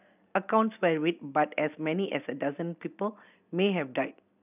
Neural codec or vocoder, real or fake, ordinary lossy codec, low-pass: none; real; none; 3.6 kHz